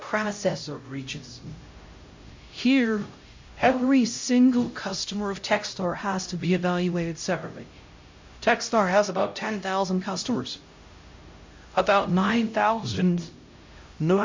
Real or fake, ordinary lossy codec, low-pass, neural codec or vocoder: fake; MP3, 48 kbps; 7.2 kHz; codec, 16 kHz, 0.5 kbps, X-Codec, HuBERT features, trained on LibriSpeech